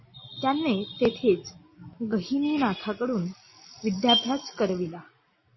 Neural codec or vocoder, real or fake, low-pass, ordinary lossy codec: none; real; 7.2 kHz; MP3, 24 kbps